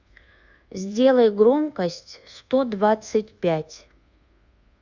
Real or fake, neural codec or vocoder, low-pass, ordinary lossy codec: fake; codec, 24 kHz, 1.2 kbps, DualCodec; 7.2 kHz; Opus, 64 kbps